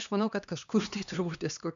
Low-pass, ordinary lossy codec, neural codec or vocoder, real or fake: 7.2 kHz; AAC, 96 kbps; codec, 16 kHz, 2 kbps, X-Codec, HuBERT features, trained on LibriSpeech; fake